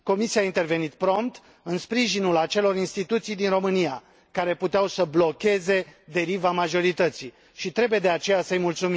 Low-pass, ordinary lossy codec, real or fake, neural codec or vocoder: none; none; real; none